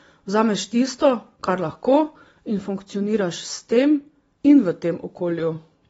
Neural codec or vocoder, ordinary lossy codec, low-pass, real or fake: none; AAC, 24 kbps; 19.8 kHz; real